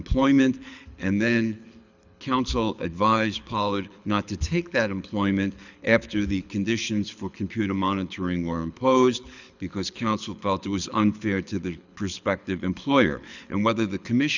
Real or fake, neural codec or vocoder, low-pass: fake; codec, 24 kHz, 6 kbps, HILCodec; 7.2 kHz